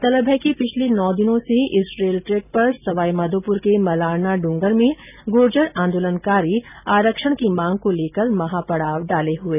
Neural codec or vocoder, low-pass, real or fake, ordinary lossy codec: none; 3.6 kHz; real; none